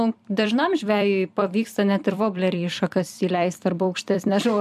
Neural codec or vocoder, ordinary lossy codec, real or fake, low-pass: vocoder, 44.1 kHz, 128 mel bands every 256 samples, BigVGAN v2; MP3, 96 kbps; fake; 14.4 kHz